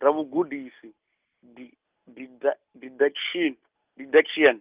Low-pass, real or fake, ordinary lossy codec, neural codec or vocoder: 3.6 kHz; real; Opus, 24 kbps; none